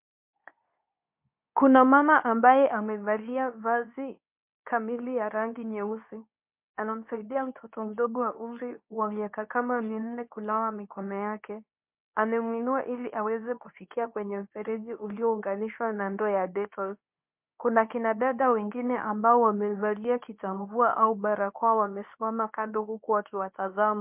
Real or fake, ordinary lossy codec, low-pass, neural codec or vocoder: fake; MP3, 32 kbps; 3.6 kHz; codec, 24 kHz, 0.9 kbps, WavTokenizer, medium speech release version 2